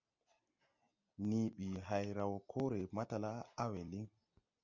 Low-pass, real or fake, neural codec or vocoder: 7.2 kHz; real; none